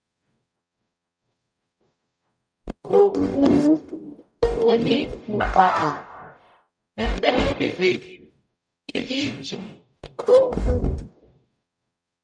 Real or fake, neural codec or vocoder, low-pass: fake; codec, 44.1 kHz, 0.9 kbps, DAC; 9.9 kHz